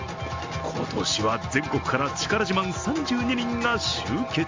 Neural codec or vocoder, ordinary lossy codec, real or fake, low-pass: none; Opus, 32 kbps; real; 7.2 kHz